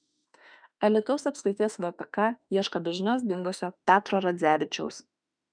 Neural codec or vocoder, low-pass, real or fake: autoencoder, 48 kHz, 32 numbers a frame, DAC-VAE, trained on Japanese speech; 9.9 kHz; fake